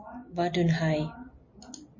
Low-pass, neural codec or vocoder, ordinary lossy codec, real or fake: 7.2 kHz; none; MP3, 32 kbps; real